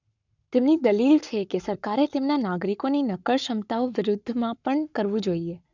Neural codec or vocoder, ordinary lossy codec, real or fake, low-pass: codec, 44.1 kHz, 7.8 kbps, Pupu-Codec; none; fake; 7.2 kHz